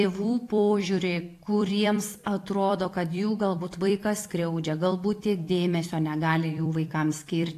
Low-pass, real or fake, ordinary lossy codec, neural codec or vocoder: 14.4 kHz; fake; AAC, 64 kbps; vocoder, 44.1 kHz, 128 mel bands every 256 samples, BigVGAN v2